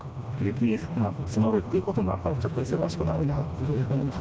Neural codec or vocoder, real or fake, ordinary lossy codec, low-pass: codec, 16 kHz, 1 kbps, FreqCodec, smaller model; fake; none; none